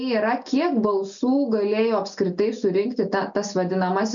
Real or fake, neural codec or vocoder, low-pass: real; none; 7.2 kHz